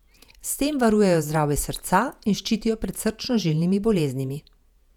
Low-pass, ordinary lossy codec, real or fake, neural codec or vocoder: 19.8 kHz; none; fake; vocoder, 48 kHz, 128 mel bands, Vocos